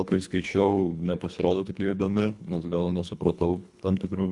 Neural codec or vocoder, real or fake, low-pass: codec, 24 kHz, 1.5 kbps, HILCodec; fake; 10.8 kHz